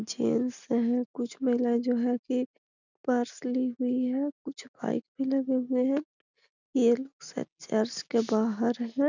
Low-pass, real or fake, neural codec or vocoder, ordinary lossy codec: 7.2 kHz; real; none; none